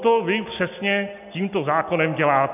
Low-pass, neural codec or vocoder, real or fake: 3.6 kHz; none; real